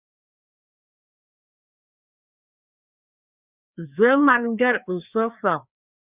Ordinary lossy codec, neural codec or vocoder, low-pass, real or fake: Opus, 64 kbps; codec, 16 kHz, 2 kbps, FreqCodec, larger model; 3.6 kHz; fake